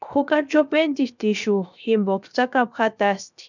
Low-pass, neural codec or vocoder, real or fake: 7.2 kHz; codec, 16 kHz, 0.7 kbps, FocalCodec; fake